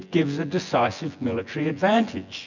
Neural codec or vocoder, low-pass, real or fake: vocoder, 24 kHz, 100 mel bands, Vocos; 7.2 kHz; fake